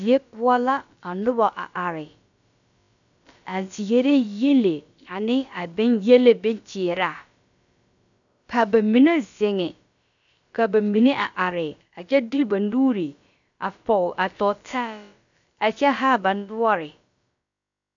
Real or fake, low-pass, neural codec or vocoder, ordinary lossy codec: fake; 7.2 kHz; codec, 16 kHz, about 1 kbps, DyCAST, with the encoder's durations; MP3, 96 kbps